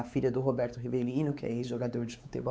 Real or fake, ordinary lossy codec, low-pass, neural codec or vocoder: fake; none; none; codec, 16 kHz, 4 kbps, X-Codec, WavLM features, trained on Multilingual LibriSpeech